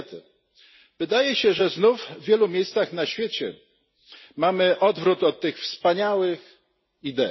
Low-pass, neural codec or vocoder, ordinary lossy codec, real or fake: 7.2 kHz; none; MP3, 24 kbps; real